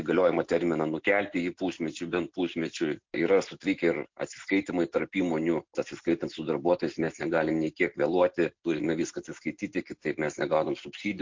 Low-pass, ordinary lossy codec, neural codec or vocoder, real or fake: 7.2 kHz; MP3, 48 kbps; none; real